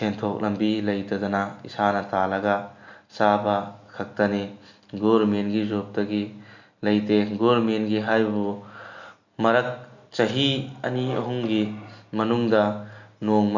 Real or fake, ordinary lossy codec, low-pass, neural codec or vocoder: real; none; 7.2 kHz; none